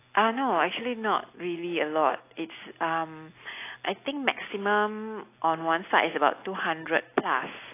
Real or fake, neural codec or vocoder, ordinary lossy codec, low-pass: real; none; AAC, 24 kbps; 3.6 kHz